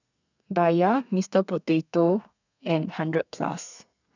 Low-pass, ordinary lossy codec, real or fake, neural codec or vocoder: 7.2 kHz; none; fake; codec, 32 kHz, 1.9 kbps, SNAC